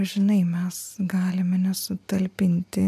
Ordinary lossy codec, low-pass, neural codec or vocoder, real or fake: MP3, 96 kbps; 14.4 kHz; none; real